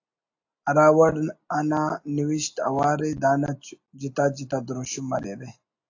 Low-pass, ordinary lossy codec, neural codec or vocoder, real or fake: 7.2 kHz; AAC, 48 kbps; none; real